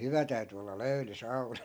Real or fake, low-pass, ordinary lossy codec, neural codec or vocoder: real; none; none; none